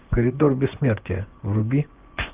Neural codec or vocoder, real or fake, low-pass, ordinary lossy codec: vocoder, 24 kHz, 100 mel bands, Vocos; fake; 3.6 kHz; Opus, 32 kbps